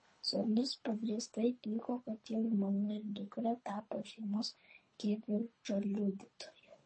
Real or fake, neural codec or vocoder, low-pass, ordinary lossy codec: fake; codec, 24 kHz, 3 kbps, HILCodec; 9.9 kHz; MP3, 32 kbps